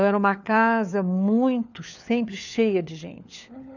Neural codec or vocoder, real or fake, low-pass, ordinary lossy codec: codec, 16 kHz, 4 kbps, FunCodec, trained on LibriTTS, 50 frames a second; fake; 7.2 kHz; none